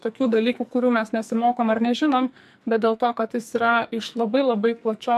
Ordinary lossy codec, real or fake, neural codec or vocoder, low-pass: MP3, 96 kbps; fake; codec, 44.1 kHz, 2.6 kbps, SNAC; 14.4 kHz